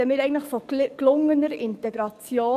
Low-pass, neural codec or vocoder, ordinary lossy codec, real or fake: 14.4 kHz; codec, 44.1 kHz, 7.8 kbps, DAC; none; fake